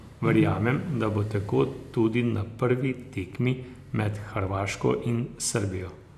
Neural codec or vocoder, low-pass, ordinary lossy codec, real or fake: vocoder, 44.1 kHz, 128 mel bands every 512 samples, BigVGAN v2; 14.4 kHz; none; fake